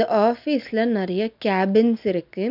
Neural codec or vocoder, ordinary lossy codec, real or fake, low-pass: none; none; real; 5.4 kHz